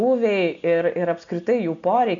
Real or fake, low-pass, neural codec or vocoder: real; 7.2 kHz; none